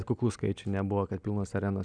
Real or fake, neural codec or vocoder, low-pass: real; none; 9.9 kHz